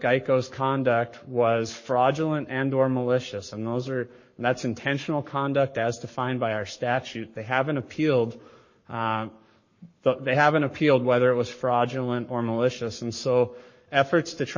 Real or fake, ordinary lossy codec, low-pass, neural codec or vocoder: fake; MP3, 32 kbps; 7.2 kHz; codec, 44.1 kHz, 7.8 kbps, Pupu-Codec